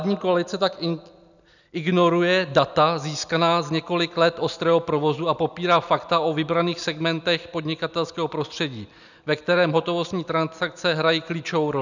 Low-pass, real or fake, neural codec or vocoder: 7.2 kHz; real; none